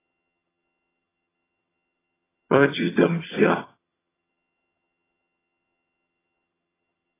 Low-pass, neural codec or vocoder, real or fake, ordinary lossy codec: 3.6 kHz; vocoder, 22.05 kHz, 80 mel bands, HiFi-GAN; fake; AAC, 16 kbps